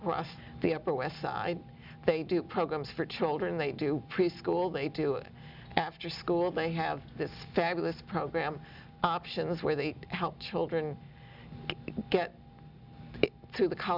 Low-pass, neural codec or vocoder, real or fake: 5.4 kHz; none; real